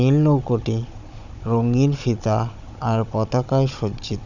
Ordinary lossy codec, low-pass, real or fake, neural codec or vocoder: none; 7.2 kHz; fake; codec, 16 kHz, 16 kbps, FunCodec, trained on Chinese and English, 50 frames a second